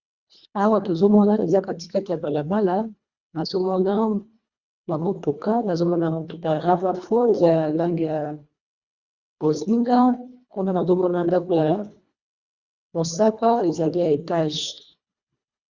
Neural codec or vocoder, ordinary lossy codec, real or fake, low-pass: codec, 24 kHz, 1.5 kbps, HILCodec; Opus, 64 kbps; fake; 7.2 kHz